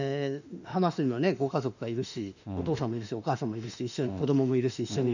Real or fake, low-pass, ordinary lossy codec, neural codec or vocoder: fake; 7.2 kHz; none; autoencoder, 48 kHz, 32 numbers a frame, DAC-VAE, trained on Japanese speech